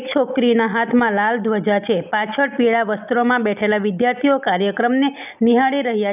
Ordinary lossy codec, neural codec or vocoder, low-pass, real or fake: none; none; 3.6 kHz; real